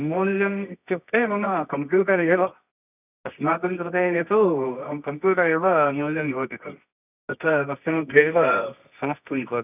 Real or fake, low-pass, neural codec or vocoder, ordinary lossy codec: fake; 3.6 kHz; codec, 24 kHz, 0.9 kbps, WavTokenizer, medium music audio release; none